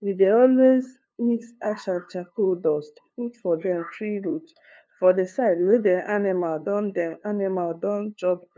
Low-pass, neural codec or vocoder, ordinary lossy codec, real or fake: none; codec, 16 kHz, 2 kbps, FunCodec, trained on LibriTTS, 25 frames a second; none; fake